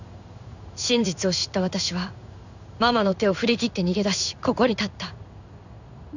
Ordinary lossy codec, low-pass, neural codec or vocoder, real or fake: none; 7.2 kHz; codec, 16 kHz in and 24 kHz out, 1 kbps, XY-Tokenizer; fake